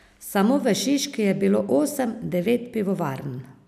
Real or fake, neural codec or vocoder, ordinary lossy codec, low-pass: real; none; none; 14.4 kHz